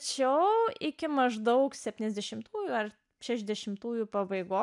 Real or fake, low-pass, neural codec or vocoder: real; 10.8 kHz; none